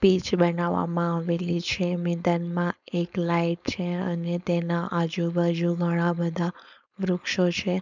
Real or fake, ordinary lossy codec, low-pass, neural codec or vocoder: fake; none; 7.2 kHz; codec, 16 kHz, 4.8 kbps, FACodec